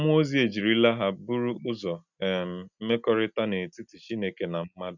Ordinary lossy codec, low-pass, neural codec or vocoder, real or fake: none; 7.2 kHz; none; real